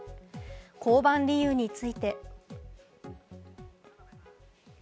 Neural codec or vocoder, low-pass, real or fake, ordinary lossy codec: none; none; real; none